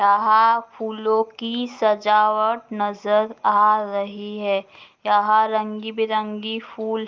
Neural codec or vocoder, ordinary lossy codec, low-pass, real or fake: none; Opus, 24 kbps; 7.2 kHz; real